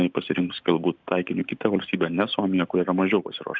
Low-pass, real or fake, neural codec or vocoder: 7.2 kHz; real; none